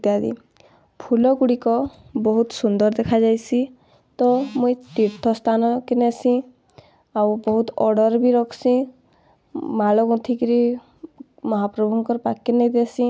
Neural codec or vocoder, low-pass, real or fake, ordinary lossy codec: none; none; real; none